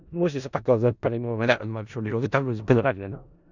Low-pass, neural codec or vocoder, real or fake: 7.2 kHz; codec, 16 kHz in and 24 kHz out, 0.4 kbps, LongCat-Audio-Codec, four codebook decoder; fake